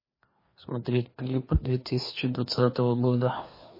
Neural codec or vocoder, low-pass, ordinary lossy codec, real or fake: codec, 16 kHz, 2 kbps, FreqCodec, larger model; 5.4 kHz; MP3, 24 kbps; fake